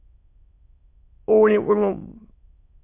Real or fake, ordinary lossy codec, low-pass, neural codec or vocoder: fake; MP3, 32 kbps; 3.6 kHz; autoencoder, 22.05 kHz, a latent of 192 numbers a frame, VITS, trained on many speakers